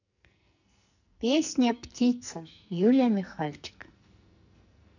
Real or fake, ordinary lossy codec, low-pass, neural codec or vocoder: fake; none; 7.2 kHz; codec, 44.1 kHz, 2.6 kbps, SNAC